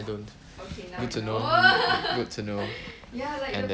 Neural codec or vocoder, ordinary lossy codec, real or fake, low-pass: none; none; real; none